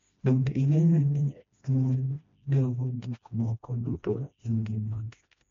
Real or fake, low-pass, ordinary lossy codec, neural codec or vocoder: fake; 7.2 kHz; MP3, 48 kbps; codec, 16 kHz, 1 kbps, FreqCodec, smaller model